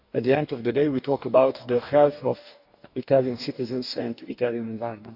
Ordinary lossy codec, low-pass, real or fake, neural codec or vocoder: none; 5.4 kHz; fake; codec, 44.1 kHz, 2.6 kbps, DAC